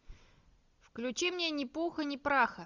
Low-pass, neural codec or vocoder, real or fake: 7.2 kHz; none; real